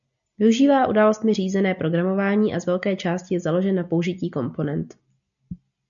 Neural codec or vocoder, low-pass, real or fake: none; 7.2 kHz; real